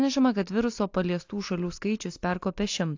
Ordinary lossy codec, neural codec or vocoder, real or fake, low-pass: AAC, 48 kbps; none; real; 7.2 kHz